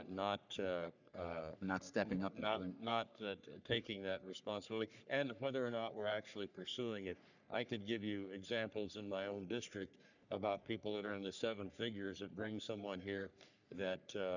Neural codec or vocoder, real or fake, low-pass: codec, 44.1 kHz, 3.4 kbps, Pupu-Codec; fake; 7.2 kHz